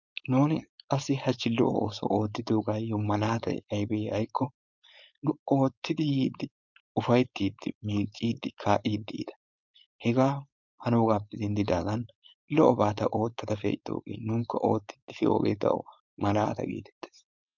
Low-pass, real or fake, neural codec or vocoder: 7.2 kHz; fake; codec, 16 kHz, 4.8 kbps, FACodec